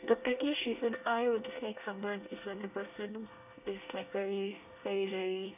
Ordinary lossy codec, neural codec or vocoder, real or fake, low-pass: none; codec, 24 kHz, 1 kbps, SNAC; fake; 3.6 kHz